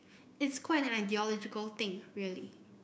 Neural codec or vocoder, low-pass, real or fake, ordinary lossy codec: codec, 16 kHz, 6 kbps, DAC; none; fake; none